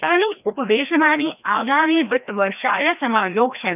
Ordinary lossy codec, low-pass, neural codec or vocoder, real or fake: none; 3.6 kHz; codec, 16 kHz, 1 kbps, FreqCodec, larger model; fake